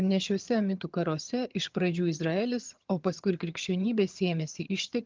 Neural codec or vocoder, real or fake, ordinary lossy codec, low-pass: vocoder, 22.05 kHz, 80 mel bands, HiFi-GAN; fake; Opus, 32 kbps; 7.2 kHz